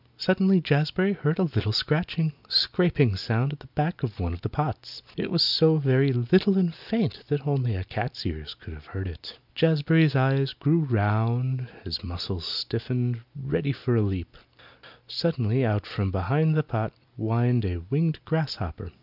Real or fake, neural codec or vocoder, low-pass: real; none; 5.4 kHz